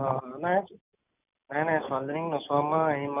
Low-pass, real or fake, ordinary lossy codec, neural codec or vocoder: 3.6 kHz; real; none; none